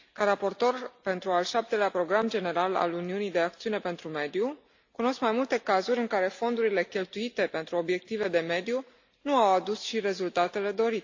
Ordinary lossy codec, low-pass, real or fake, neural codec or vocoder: AAC, 48 kbps; 7.2 kHz; real; none